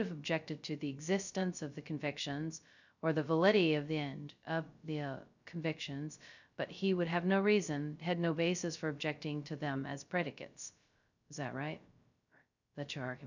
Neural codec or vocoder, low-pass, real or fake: codec, 16 kHz, 0.2 kbps, FocalCodec; 7.2 kHz; fake